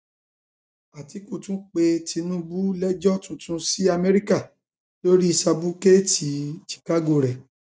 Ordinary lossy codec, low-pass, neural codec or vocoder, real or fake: none; none; none; real